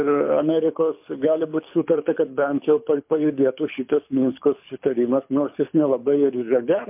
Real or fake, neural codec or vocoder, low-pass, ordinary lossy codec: fake; codec, 24 kHz, 6 kbps, HILCodec; 3.6 kHz; MP3, 32 kbps